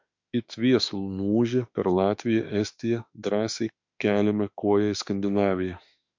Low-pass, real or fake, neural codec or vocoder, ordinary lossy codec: 7.2 kHz; fake; autoencoder, 48 kHz, 32 numbers a frame, DAC-VAE, trained on Japanese speech; MP3, 64 kbps